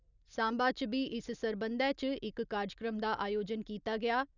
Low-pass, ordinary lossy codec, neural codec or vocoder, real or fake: 7.2 kHz; none; none; real